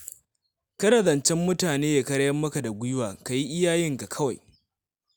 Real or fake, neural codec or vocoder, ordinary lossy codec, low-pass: real; none; none; none